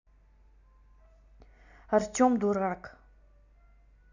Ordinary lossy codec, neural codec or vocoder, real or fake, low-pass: none; none; real; 7.2 kHz